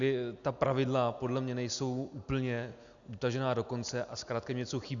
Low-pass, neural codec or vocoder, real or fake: 7.2 kHz; none; real